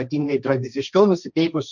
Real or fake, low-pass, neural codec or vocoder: fake; 7.2 kHz; codec, 16 kHz, 1.1 kbps, Voila-Tokenizer